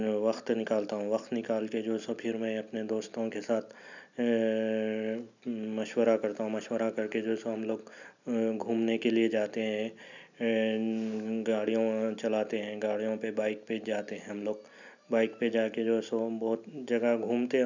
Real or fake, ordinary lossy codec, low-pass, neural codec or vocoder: real; none; 7.2 kHz; none